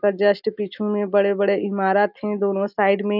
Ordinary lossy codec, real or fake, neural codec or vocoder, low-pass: none; real; none; 5.4 kHz